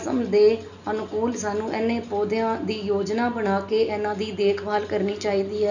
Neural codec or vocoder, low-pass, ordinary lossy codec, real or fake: none; 7.2 kHz; none; real